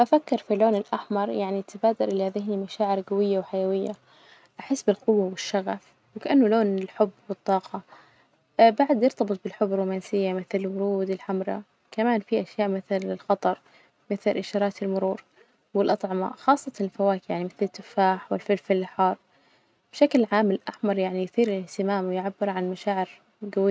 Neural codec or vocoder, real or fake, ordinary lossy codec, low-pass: none; real; none; none